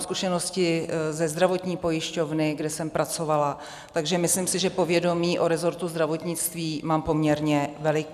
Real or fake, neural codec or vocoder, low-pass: real; none; 14.4 kHz